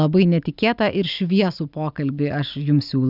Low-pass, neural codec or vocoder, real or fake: 5.4 kHz; none; real